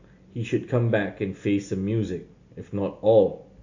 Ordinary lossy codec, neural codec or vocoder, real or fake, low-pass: AAC, 48 kbps; none; real; 7.2 kHz